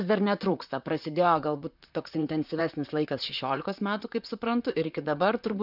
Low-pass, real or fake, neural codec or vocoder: 5.4 kHz; fake; vocoder, 44.1 kHz, 128 mel bands, Pupu-Vocoder